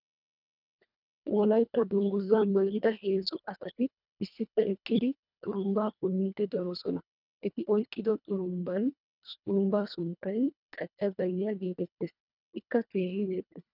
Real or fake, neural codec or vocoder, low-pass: fake; codec, 24 kHz, 1.5 kbps, HILCodec; 5.4 kHz